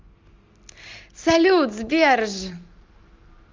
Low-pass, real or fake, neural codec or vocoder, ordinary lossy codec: 7.2 kHz; real; none; Opus, 32 kbps